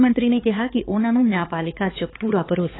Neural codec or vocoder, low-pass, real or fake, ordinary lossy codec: codec, 16 kHz, 4 kbps, X-Codec, HuBERT features, trained on balanced general audio; 7.2 kHz; fake; AAC, 16 kbps